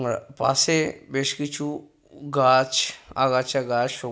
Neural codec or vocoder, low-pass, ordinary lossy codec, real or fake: none; none; none; real